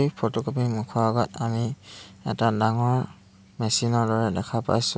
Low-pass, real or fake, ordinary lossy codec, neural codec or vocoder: none; real; none; none